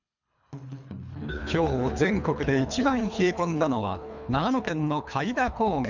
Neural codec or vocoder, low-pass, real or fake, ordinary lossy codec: codec, 24 kHz, 3 kbps, HILCodec; 7.2 kHz; fake; none